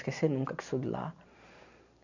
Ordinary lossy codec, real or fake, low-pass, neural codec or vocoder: none; real; 7.2 kHz; none